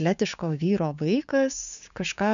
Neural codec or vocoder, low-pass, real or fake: codec, 16 kHz, 6 kbps, DAC; 7.2 kHz; fake